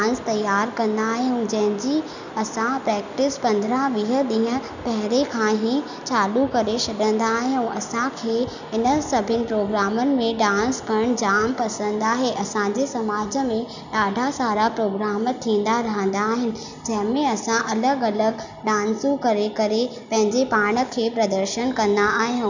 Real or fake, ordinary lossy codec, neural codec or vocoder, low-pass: real; none; none; 7.2 kHz